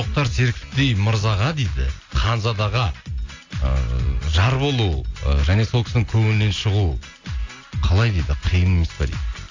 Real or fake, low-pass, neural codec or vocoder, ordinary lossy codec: real; 7.2 kHz; none; AAC, 48 kbps